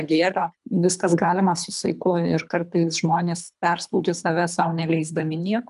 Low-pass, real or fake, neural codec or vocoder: 10.8 kHz; fake; codec, 24 kHz, 3 kbps, HILCodec